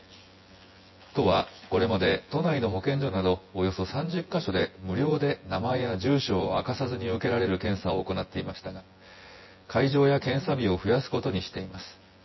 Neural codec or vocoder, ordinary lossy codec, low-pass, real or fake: vocoder, 24 kHz, 100 mel bands, Vocos; MP3, 24 kbps; 7.2 kHz; fake